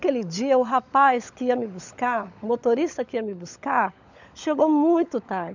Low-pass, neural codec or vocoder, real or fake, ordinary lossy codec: 7.2 kHz; codec, 16 kHz, 16 kbps, FunCodec, trained on LibriTTS, 50 frames a second; fake; none